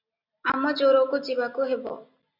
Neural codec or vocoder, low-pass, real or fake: none; 5.4 kHz; real